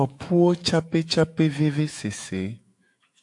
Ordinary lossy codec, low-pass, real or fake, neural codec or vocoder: AAC, 64 kbps; 10.8 kHz; fake; autoencoder, 48 kHz, 128 numbers a frame, DAC-VAE, trained on Japanese speech